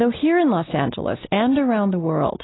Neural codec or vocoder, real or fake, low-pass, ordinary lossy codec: none; real; 7.2 kHz; AAC, 16 kbps